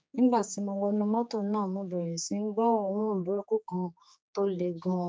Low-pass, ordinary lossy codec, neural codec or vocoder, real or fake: none; none; codec, 16 kHz, 4 kbps, X-Codec, HuBERT features, trained on general audio; fake